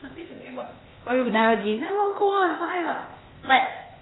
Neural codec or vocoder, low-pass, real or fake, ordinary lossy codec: codec, 16 kHz, 0.8 kbps, ZipCodec; 7.2 kHz; fake; AAC, 16 kbps